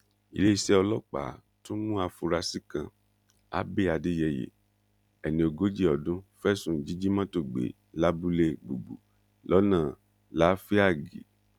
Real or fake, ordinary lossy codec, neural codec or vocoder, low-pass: real; none; none; 19.8 kHz